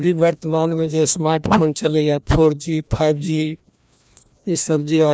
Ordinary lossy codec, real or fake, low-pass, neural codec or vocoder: none; fake; none; codec, 16 kHz, 1 kbps, FreqCodec, larger model